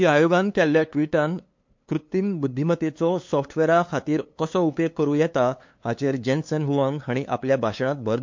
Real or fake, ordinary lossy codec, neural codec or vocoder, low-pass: fake; MP3, 48 kbps; codec, 16 kHz, 2 kbps, FunCodec, trained on LibriTTS, 25 frames a second; 7.2 kHz